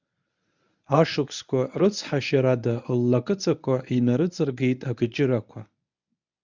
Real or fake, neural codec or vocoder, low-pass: fake; codec, 24 kHz, 0.9 kbps, WavTokenizer, medium speech release version 1; 7.2 kHz